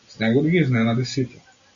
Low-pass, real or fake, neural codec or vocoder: 7.2 kHz; real; none